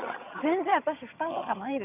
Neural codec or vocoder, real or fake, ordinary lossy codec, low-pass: vocoder, 22.05 kHz, 80 mel bands, HiFi-GAN; fake; none; 3.6 kHz